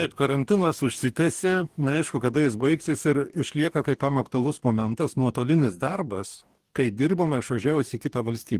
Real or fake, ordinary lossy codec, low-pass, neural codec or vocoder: fake; Opus, 24 kbps; 14.4 kHz; codec, 44.1 kHz, 2.6 kbps, DAC